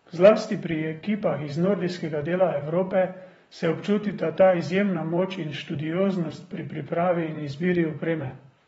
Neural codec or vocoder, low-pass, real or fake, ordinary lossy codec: vocoder, 44.1 kHz, 128 mel bands, Pupu-Vocoder; 19.8 kHz; fake; AAC, 24 kbps